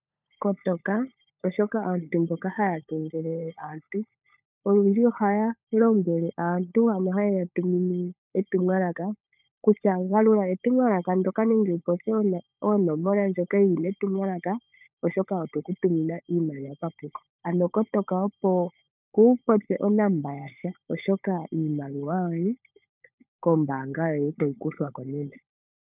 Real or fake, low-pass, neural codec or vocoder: fake; 3.6 kHz; codec, 16 kHz, 16 kbps, FunCodec, trained on LibriTTS, 50 frames a second